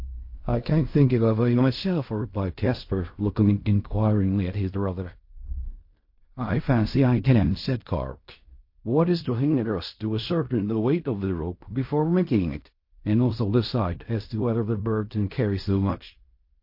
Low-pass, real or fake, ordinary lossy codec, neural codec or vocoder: 5.4 kHz; fake; MP3, 32 kbps; codec, 16 kHz in and 24 kHz out, 0.4 kbps, LongCat-Audio-Codec, fine tuned four codebook decoder